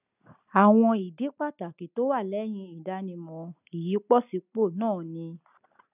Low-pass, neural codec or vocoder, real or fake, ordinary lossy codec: 3.6 kHz; none; real; none